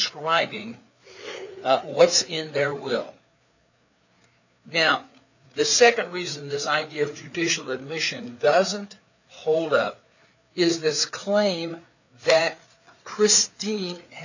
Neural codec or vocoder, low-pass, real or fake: codec, 16 kHz, 4 kbps, FreqCodec, larger model; 7.2 kHz; fake